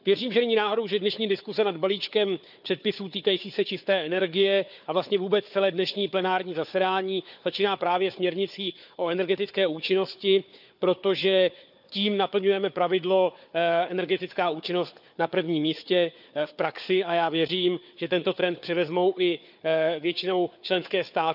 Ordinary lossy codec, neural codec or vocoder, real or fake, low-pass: none; codec, 16 kHz, 4 kbps, FunCodec, trained on Chinese and English, 50 frames a second; fake; 5.4 kHz